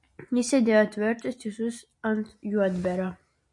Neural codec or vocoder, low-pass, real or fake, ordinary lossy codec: none; 10.8 kHz; real; MP3, 48 kbps